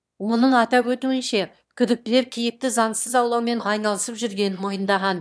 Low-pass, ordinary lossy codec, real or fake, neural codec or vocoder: none; none; fake; autoencoder, 22.05 kHz, a latent of 192 numbers a frame, VITS, trained on one speaker